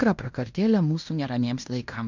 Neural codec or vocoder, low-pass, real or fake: codec, 16 kHz in and 24 kHz out, 0.9 kbps, LongCat-Audio-Codec, fine tuned four codebook decoder; 7.2 kHz; fake